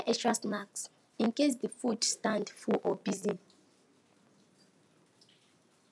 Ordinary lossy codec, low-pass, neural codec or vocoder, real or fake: none; none; none; real